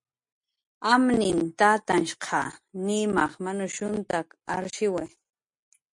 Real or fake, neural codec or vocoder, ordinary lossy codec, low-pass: real; none; MP3, 48 kbps; 10.8 kHz